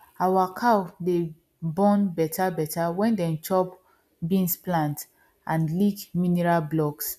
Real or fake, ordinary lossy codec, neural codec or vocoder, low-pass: real; none; none; 14.4 kHz